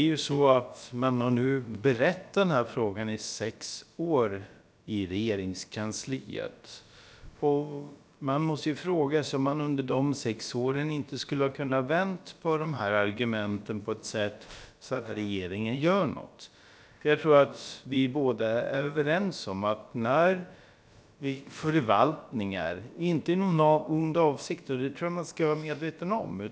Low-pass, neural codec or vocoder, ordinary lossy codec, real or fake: none; codec, 16 kHz, about 1 kbps, DyCAST, with the encoder's durations; none; fake